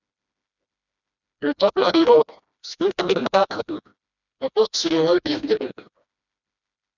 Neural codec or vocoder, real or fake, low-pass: codec, 16 kHz, 1 kbps, FreqCodec, smaller model; fake; 7.2 kHz